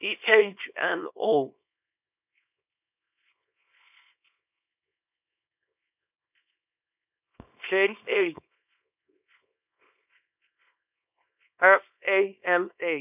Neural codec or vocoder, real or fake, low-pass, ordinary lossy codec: codec, 24 kHz, 0.9 kbps, WavTokenizer, small release; fake; 3.6 kHz; none